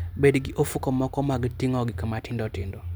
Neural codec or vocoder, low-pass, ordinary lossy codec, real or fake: none; none; none; real